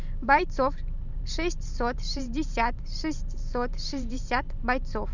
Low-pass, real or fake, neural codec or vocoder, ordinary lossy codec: 7.2 kHz; fake; vocoder, 44.1 kHz, 128 mel bands every 512 samples, BigVGAN v2; none